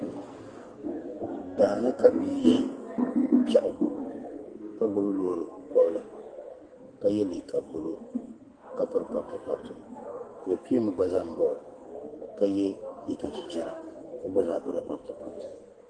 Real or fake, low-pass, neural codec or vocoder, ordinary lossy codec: fake; 9.9 kHz; codec, 44.1 kHz, 2.6 kbps, SNAC; Opus, 24 kbps